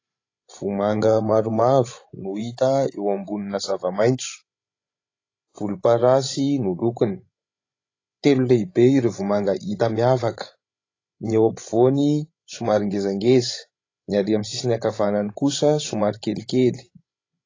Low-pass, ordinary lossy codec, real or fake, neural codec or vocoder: 7.2 kHz; AAC, 32 kbps; fake; codec, 16 kHz, 16 kbps, FreqCodec, larger model